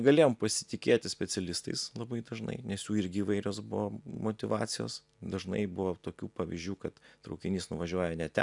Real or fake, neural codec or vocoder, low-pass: real; none; 10.8 kHz